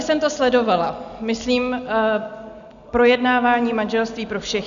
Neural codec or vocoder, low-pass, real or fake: none; 7.2 kHz; real